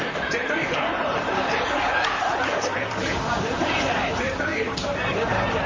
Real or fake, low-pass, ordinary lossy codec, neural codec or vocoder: fake; 7.2 kHz; Opus, 32 kbps; vocoder, 44.1 kHz, 128 mel bands, Pupu-Vocoder